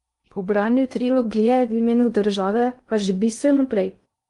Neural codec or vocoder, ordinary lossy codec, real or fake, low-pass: codec, 16 kHz in and 24 kHz out, 0.6 kbps, FocalCodec, streaming, 2048 codes; Opus, 32 kbps; fake; 10.8 kHz